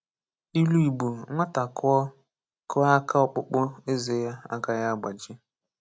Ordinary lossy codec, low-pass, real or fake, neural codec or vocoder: none; none; real; none